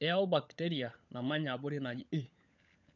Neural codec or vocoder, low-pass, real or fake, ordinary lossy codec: codec, 16 kHz, 16 kbps, FunCodec, trained on LibriTTS, 50 frames a second; 7.2 kHz; fake; none